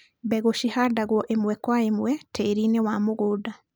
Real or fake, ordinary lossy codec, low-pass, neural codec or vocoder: real; none; none; none